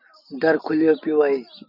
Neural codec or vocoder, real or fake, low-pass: none; real; 5.4 kHz